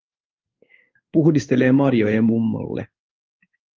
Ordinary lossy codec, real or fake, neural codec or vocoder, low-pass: Opus, 24 kbps; fake; codec, 16 kHz in and 24 kHz out, 1 kbps, XY-Tokenizer; 7.2 kHz